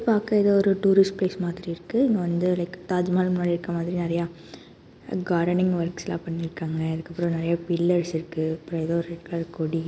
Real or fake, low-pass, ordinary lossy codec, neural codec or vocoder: real; none; none; none